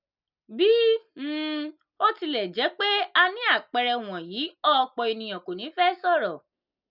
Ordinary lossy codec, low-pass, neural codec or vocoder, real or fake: none; 5.4 kHz; none; real